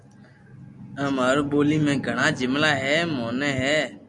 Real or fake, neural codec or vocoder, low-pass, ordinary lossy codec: real; none; 10.8 kHz; MP3, 96 kbps